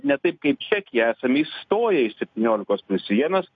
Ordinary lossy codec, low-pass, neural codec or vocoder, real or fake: MP3, 48 kbps; 7.2 kHz; none; real